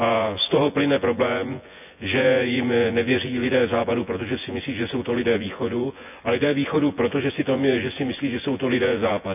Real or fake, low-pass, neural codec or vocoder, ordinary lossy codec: fake; 3.6 kHz; vocoder, 24 kHz, 100 mel bands, Vocos; none